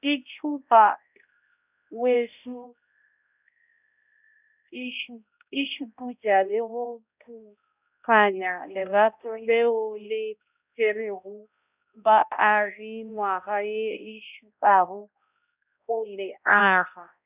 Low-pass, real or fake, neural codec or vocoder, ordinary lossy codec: 3.6 kHz; fake; codec, 16 kHz, 0.5 kbps, X-Codec, HuBERT features, trained on balanced general audio; none